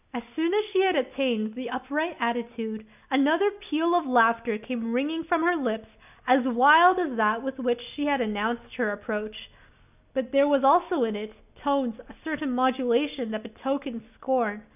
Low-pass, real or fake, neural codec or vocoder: 3.6 kHz; real; none